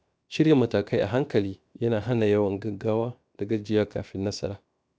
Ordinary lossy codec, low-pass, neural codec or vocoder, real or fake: none; none; codec, 16 kHz, 0.7 kbps, FocalCodec; fake